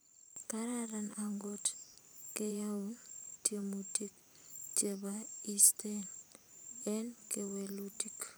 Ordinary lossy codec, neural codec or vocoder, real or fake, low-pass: none; vocoder, 44.1 kHz, 128 mel bands every 512 samples, BigVGAN v2; fake; none